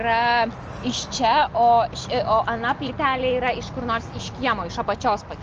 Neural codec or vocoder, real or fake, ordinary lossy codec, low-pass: none; real; Opus, 24 kbps; 7.2 kHz